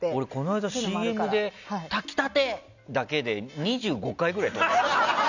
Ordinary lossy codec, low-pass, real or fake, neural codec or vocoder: none; 7.2 kHz; real; none